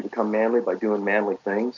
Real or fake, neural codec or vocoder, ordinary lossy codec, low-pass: real; none; MP3, 48 kbps; 7.2 kHz